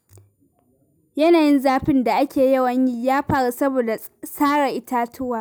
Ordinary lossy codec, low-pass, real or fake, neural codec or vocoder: none; none; real; none